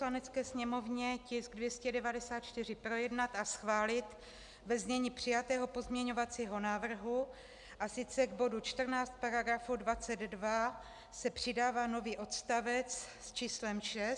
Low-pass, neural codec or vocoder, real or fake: 10.8 kHz; none; real